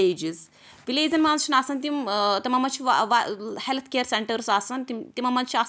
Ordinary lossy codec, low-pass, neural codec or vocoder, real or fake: none; none; none; real